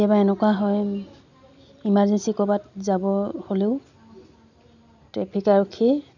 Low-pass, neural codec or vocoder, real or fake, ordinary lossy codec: 7.2 kHz; none; real; none